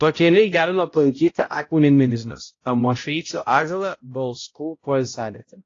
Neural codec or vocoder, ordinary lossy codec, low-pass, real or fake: codec, 16 kHz, 0.5 kbps, X-Codec, HuBERT features, trained on balanced general audio; AAC, 32 kbps; 7.2 kHz; fake